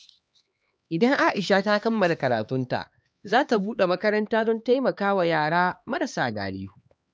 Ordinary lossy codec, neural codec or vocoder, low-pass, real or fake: none; codec, 16 kHz, 2 kbps, X-Codec, HuBERT features, trained on LibriSpeech; none; fake